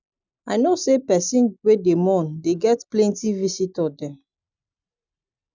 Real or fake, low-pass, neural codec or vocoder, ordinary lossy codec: real; 7.2 kHz; none; none